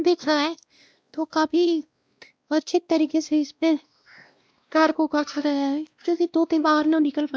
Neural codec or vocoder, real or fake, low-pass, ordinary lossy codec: codec, 16 kHz, 1 kbps, X-Codec, WavLM features, trained on Multilingual LibriSpeech; fake; none; none